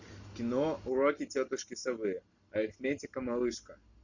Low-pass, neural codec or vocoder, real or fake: 7.2 kHz; none; real